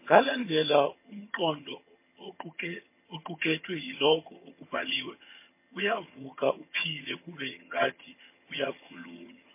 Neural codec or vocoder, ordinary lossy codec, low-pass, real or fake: vocoder, 22.05 kHz, 80 mel bands, HiFi-GAN; MP3, 24 kbps; 3.6 kHz; fake